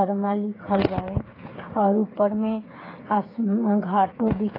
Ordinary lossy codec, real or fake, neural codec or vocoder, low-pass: AAC, 24 kbps; fake; codec, 16 kHz, 8 kbps, FreqCodec, smaller model; 5.4 kHz